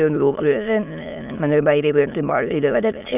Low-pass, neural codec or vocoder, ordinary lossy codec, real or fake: 3.6 kHz; autoencoder, 22.05 kHz, a latent of 192 numbers a frame, VITS, trained on many speakers; none; fake